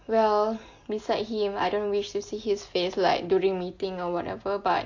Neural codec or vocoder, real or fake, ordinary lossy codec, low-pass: none; real; AAC, 48 kbps; 7.2 kHz